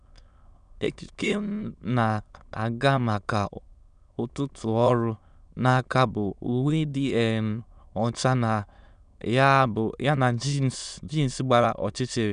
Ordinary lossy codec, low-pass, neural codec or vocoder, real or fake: none; 9.9 kHz; autoencoder, 22.05 kHz, a latent of 192 numbers a frame, VITS, trained on many speakers; fake